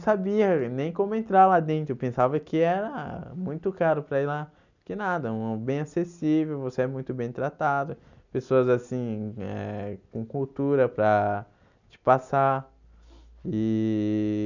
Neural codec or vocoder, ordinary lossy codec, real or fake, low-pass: none; none; real; 7.2 kHz